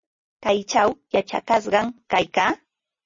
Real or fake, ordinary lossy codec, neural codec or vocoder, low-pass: real; MP3, 32 kbps; none; 7.2 kHz